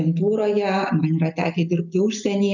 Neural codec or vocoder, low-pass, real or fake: none; 7.2 kHz; real